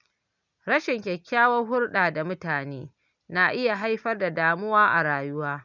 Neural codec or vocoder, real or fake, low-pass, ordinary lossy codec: none; real; 7.2 kHz; none